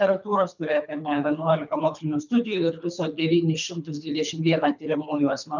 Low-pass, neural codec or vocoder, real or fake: 7.2 kHz; codec, 24 kHz, 3 kbps, HILCodec; fake